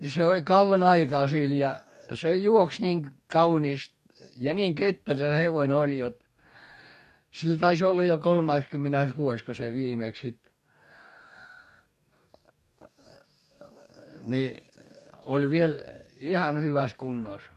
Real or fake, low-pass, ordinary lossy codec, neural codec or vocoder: fake; 14.4 kHz; MP3, 64 kbps; codec, 44.1 kHz, 2.6 kbps, DAC